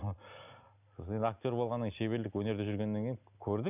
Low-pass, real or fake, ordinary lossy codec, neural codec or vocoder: 3.6 kHz; real; none; none